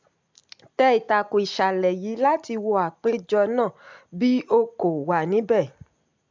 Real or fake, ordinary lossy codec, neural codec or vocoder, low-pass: real; MP3, 64 kbps; none; 7.2 kHz